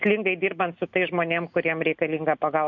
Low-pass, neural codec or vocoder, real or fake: 7.2 kHz; none; real